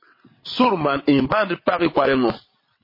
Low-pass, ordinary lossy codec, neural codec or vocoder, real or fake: 5.4 kHz; MP3, 24 kbps; vocoder, 22.05 kHz, 80 mel bands, WaveNeXt; fake